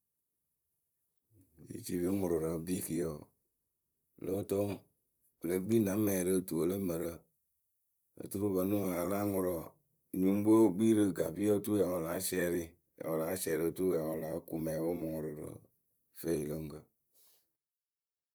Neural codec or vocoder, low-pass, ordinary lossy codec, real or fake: vocoder, 44.1 kHz, 128 mel bands, Pupu-Vocoder; none; none; fake